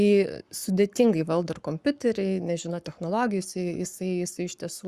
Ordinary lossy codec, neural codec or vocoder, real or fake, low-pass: Opus, 64 kbps; codec, 44.1 kHz, 7.8 kbps, Pupu-Codec; fake; 14.4 kHz